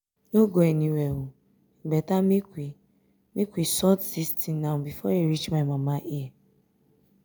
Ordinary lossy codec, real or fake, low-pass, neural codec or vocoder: none; real; none; none